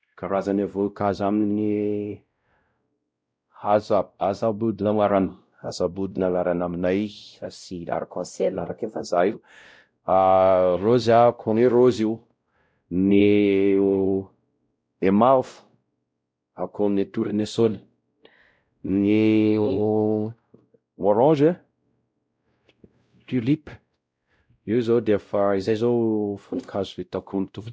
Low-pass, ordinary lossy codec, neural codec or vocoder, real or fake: none; none; codec, 16 kHz, 0.5 kbps, X-Codec, WavLM features, trained on Multilingual LibriSpeech; fake